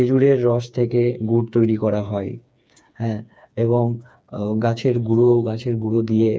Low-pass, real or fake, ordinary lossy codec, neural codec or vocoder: none; fake; none; codec, 16 kHz, 4 kbps, FreqCodec, smaller model